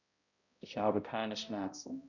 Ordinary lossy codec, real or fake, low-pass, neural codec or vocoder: none; fake; 7.2 kHz; codec, 16 kHz, 0.5 kbps, X-Codec, HuBERT features, trained on balanced general audio